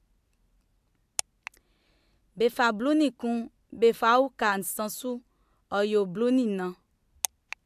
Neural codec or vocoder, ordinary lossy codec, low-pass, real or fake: none; AAC, 96 kbps; 14.4 kHz; real